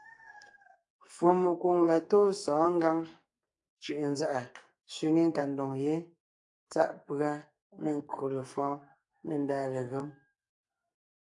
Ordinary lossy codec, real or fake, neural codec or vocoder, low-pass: AAC, 64 kbps; fake; codec, 44.1 kHz, 2.6 kbps, SNAC; 10.8 kHz